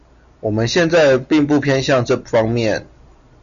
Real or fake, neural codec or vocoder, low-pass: real; none; 7.2 kHz